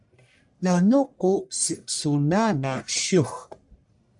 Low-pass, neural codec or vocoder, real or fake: 10.8 kHz; codec, 44.1 kHz, 1.7 kbps, Pupu-Codec; fake